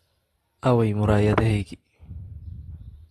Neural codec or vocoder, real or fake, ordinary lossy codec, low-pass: vocoder, 44.1 kHz, 128 mel bands every 512 samples, BigVGAN v2; fake; AAC, 32 kbps; 19.8 kHz